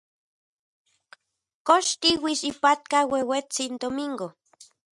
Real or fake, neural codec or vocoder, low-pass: real; none; 10.8 kHz